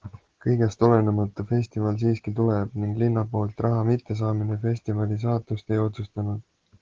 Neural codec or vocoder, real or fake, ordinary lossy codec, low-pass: none; real; Opus, 16 kbps; 7.2 kHz